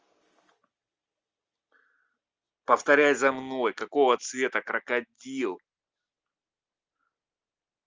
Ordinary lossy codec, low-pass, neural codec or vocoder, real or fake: Opus, 24 kbps; 7.2 kHz; none; real